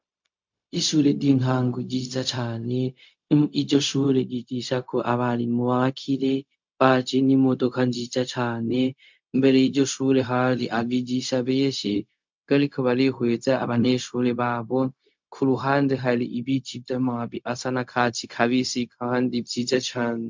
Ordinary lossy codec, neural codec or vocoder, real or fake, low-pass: MP3, 64 kbps; codec, 16 kHz, 0.4 kbps, LongCat-Audio-Codec; fake; 7.2 kHz